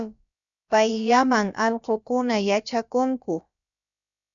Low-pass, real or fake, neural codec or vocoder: 7.2 kHz; fake; codec, 16 kHz, about 1 kbps, DyCAST, with the encoder's durations